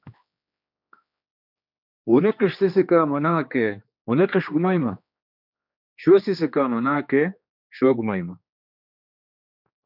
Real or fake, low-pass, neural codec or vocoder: fake; 5.4 kHz; codec, 16 kHz, 2 kbps, X-Codec, HuBERT features, trained on general audio